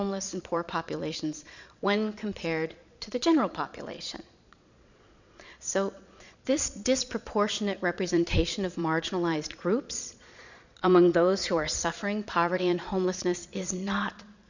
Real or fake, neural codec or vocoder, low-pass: fake; vocoder, 22.05 kHz, 80 mel bands, Vocos; 7.2 kHz